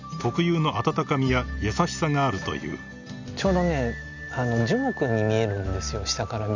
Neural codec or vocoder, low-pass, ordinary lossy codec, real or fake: none; 7.2 kHz; none; real